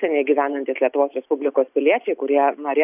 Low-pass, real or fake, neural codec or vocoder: 3.6 kHz; real; none